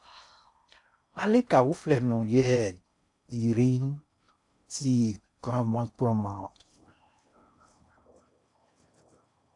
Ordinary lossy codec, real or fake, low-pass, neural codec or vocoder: AAC, 64 kbps; fake; 10.8 kHz; codec, 16 kHz in and 24 kHz out, 0.6 kbps, FocalCodec, streaming, 4096 codes